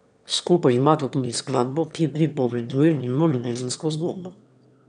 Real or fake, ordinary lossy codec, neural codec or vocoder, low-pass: fake; none; autoencoder, 22.05 kHz, a latent of 192 numbers a frame, VITS, trained on one speaker; 9.9 kHz